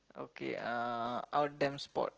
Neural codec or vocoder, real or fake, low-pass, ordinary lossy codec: none; real; 7.2 kHz; Opus, 16 kbps